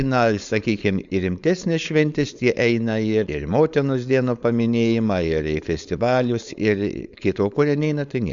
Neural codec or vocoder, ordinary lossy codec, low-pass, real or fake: codec, 16 kHz, 4.8 kbps, FACodec; Opus, 64 kbps; 7.2 kHz; fake